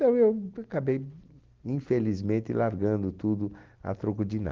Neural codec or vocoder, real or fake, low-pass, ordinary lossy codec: none; real; 7.2 kHz; Opus, 16 kbps